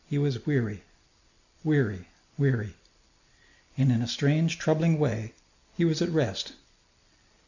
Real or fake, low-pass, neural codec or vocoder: real; 7.2 kHz; none